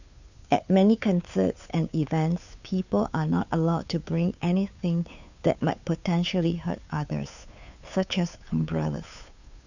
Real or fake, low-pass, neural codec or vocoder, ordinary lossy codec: fake; 7.2 kHz; codec, 16 kHz, 2 kbps, FunCodec, trained on Chinese and English, 25 frames a second; none